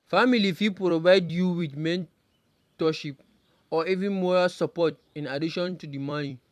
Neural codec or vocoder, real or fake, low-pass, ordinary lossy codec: vocoder, 44.1 kHz, 128 mel bands every 256 samples, BigVGAN v2; fake; 14.4 kHz; AAC, 96 kbps